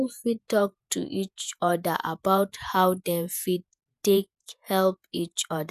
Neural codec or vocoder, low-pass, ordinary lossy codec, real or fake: vocoder, 48 kHz, 128 mel bands, Vocos; 14.4 kHz; none; fake